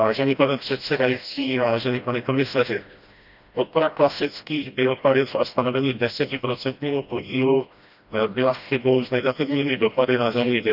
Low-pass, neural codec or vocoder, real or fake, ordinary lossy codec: 5.4 kHz; codec, 16 kHz, 1 kbps, FreqCodec, smaller model; fake; none